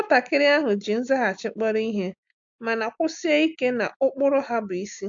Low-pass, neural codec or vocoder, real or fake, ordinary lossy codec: 7.2 kHz; none; real; AAC, 64 kbps